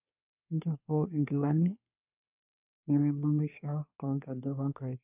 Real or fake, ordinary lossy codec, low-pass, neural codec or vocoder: fake; none; 3.6 kHz; codec, 24 kHz, 1 kbps, SNAC